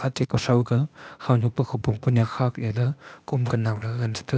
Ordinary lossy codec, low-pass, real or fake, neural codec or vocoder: none; none; fake; codec, 16 kHz, 0.8 kbps, ZipCodec